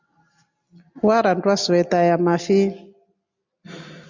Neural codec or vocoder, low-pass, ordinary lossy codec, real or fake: none; 7.2 kHz; MP3, 64 kbps; real